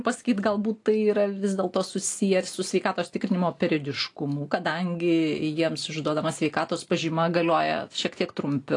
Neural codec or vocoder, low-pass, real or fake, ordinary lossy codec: none; 10.8 kHz; real; AAC, 48 kbps